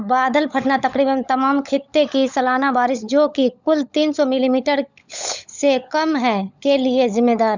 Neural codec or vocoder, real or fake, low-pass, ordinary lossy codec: codec, 16 kHz, 16 kbps, FunCodec, trained on LibriTTS, 50 frames a second; fake; 7.2 kHz; Opus, 64 kbps